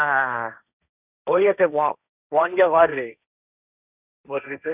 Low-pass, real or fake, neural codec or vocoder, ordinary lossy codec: 3.6 kHz; fake; codec, 16 kHz, 1.1 kbps, Voila-Tokenizer; none